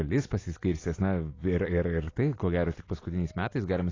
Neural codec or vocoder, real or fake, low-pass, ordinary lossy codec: none; real; 7.2 kHz; AAC, 32 kbps